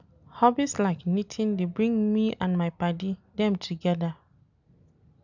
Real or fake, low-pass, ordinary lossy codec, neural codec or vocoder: real; 7.2 kHz; none; none